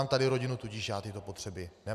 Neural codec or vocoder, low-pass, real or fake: none; 14.4 kHz; real